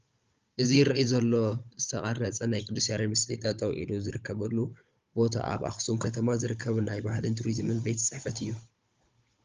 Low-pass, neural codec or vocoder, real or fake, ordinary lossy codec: 7.2 kHz; codec, 16 kHz, 16 kbps, FunCodec, trained on Chinese and English, 50 frames a second; fake; Opus, 32 kbps